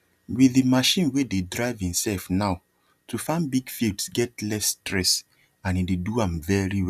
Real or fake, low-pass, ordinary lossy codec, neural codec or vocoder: real; 14.4 kHz; none; none